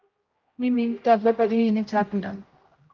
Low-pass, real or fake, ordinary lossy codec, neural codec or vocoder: 7.2 kHz; fake; Opus, 24 kbps; codec, 16 kHz, 0.5 kbps, X-Codec, HuBERT features, trained on general audio